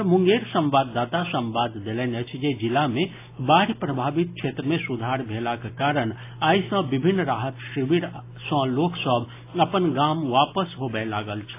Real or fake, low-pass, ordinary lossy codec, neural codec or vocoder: real; 3.6 kHz; AAC, 24 kbps; none